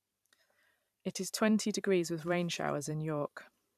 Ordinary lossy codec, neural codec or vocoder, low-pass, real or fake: none; vocoder, 44.1 kHz, 128 mel bands every 512 samples, BigVGAN v2; 14.4 kHz; fake